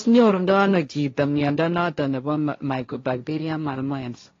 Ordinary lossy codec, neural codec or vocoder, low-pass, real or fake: AAC, 32 kbps; codec, 16 kHz, 1.1 kbps, Voila-Tokenizer; 7.2 kHz; fake